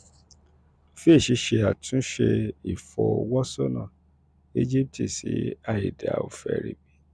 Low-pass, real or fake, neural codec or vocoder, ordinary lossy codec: none; real; none; none